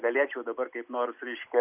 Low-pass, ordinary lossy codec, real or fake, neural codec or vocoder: 3.6 kHz; AAC, 32 kbps; real; none